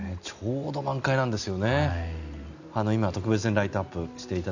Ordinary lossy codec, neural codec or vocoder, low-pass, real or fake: none; none; 7.2 kHz; real